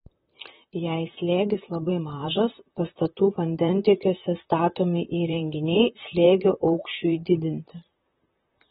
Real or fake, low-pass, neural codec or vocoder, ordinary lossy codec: fake; 19.8 kHz; vocoder, 44.1 kHz, 128 mel bands, Pupu-Vocoder; AAC, 16 kbps